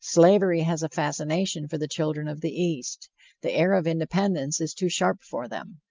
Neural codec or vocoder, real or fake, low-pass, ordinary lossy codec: none; real; 7.2 kHz; Opus, 24 kbps